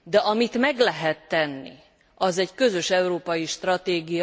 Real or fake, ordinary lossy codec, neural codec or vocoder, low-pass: real; none; none; none